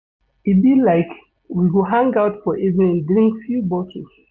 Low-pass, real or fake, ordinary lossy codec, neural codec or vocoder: 7.2 kHz; real; none; none